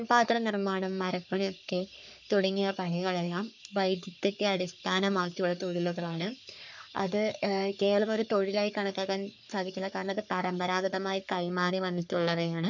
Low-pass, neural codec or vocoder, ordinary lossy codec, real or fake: 7.2 kHz; codec, 44.1 kHz, 3.4 kbps, Pupu-Codec; none; fake